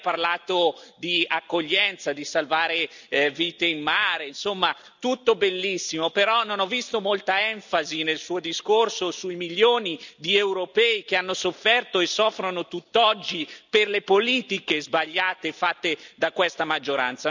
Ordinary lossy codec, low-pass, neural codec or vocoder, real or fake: none; 7.2 kHz; none; real